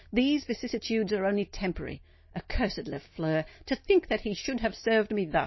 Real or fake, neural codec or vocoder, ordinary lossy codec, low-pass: real; none; MP3, 24 kbps; 7.2 kHz